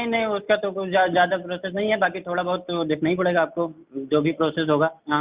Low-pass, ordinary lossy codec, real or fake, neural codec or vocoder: 3.6 kHz; Opus, 64 kbps; real; none